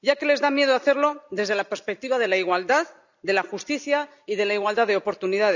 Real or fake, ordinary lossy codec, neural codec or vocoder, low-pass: real; none; none; 7.2 kHz